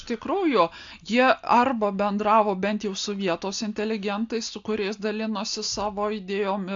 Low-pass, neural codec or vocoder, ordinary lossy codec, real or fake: 7.2 kHz; none; AAC, 64 kbps; real